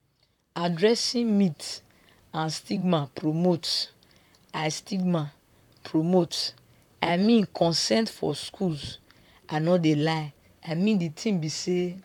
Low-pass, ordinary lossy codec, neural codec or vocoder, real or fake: 19.8 kHz; none; vocoder, 44.1 kHz, 128 mel bands, Pupu-Vocoder; fake